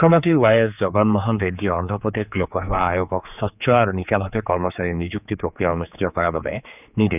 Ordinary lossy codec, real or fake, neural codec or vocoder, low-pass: none; fake; codec, 16 kHz, 4 kbps, X-Codec, HuBERT features, trained on general audio; 3.6 kHz